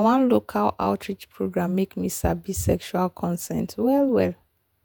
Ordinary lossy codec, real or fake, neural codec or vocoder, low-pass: none; fake; vocoder, 48 kHz, 128 mel bands, Vocos; none